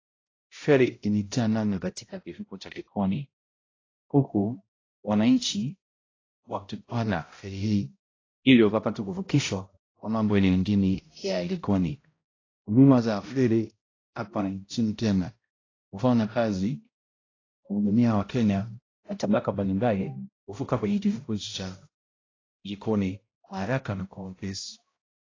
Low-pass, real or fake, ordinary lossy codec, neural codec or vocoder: 7.2 kHz; fake; AAC, 32 kbps; codec, 16 kHz, 0.5 kbps, X-Codec, HuBERT features, trained on balanced general audio